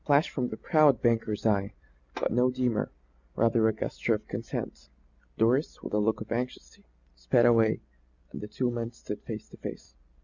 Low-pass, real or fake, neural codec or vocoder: 7.2 kHz; real; none